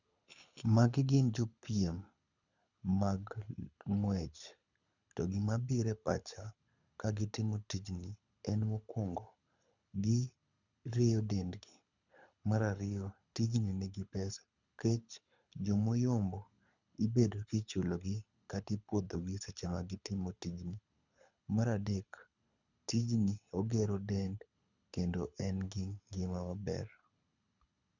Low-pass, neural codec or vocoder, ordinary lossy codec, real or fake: 7.2 kHz; codec, 24 kHz, 6 kbps, HILCodec; none; fake